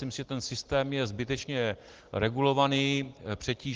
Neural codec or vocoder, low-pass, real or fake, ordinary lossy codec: none; 7.2 kHz; real; Opus, 16 kbps